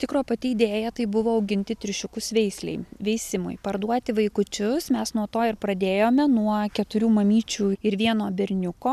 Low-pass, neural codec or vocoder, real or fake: 14.4 kHz; none; real